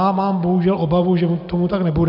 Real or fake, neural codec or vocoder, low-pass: real; none; 5.4 kHz